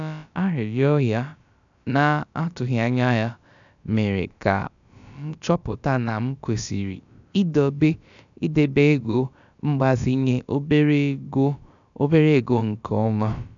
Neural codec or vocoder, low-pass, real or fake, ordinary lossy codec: codec, 16 kHz, about 1 kbps, DyCAST, with the encoder's durations; 7.2 kHz; fake; none